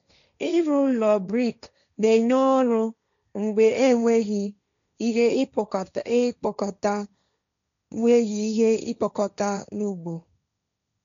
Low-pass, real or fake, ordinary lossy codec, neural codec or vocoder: 7.2 kHz; fake; none; codec, 16 kHz, 1.1 kbps, Voila-Tokenizer